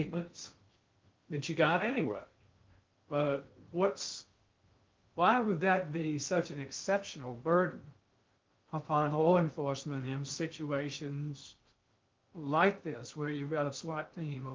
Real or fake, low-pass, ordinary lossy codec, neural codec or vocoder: fake; 7.2 kHz; Opus, 32 kbps; codec, 16 kHz in and 24 kHz out, 0.6 kbps, FocalCodec, streaming, 2048 codes